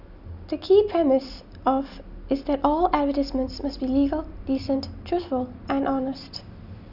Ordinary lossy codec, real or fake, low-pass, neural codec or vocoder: none; real; 5.4 kHz; none